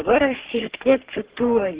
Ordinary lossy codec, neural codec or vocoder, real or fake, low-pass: Opus, 16 kbps; codec, 44.1 kHz, 3.4 kbps, Pupu-Codec; fake; 3.6 kHz